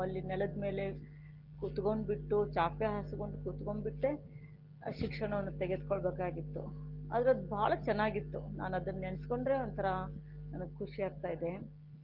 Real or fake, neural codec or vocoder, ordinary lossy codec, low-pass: real; none; Opus, 16 kbps; 5.4 kHz